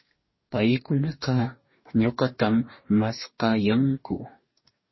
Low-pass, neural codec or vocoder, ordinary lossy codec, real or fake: 7.2 kHz; codec, 44.1 kHz, 2.6 kbps, DAC; MP3, 24 kbps; fake